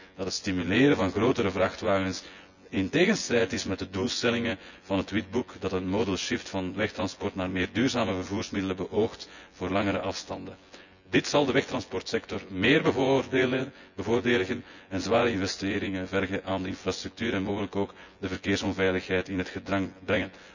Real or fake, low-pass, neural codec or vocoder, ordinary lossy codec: fake; 7.2 kHz; vocoder, 24 kHz, 100 mel bands, Vocos; none